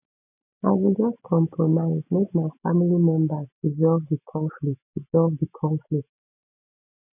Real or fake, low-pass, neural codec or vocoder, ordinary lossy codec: real; 3.6 kHz; none; none